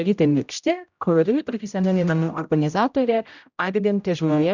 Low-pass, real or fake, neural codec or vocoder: 7.2 kHz; fake; codec, 16 kHz, 0.5 kbps, X-Codec, HuBERT features, trained on general audio